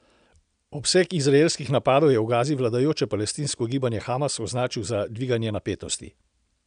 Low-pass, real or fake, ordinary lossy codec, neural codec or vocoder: 9.9 kHz; real; none; none